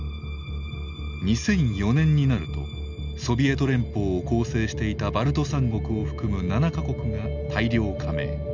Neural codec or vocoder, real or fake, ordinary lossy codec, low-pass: none; real; none; 7.2 kHz